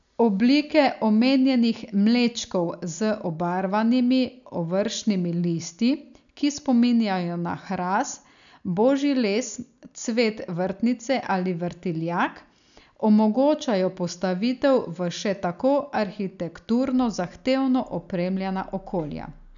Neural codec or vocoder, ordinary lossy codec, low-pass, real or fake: none; none; 7.2 kHz; real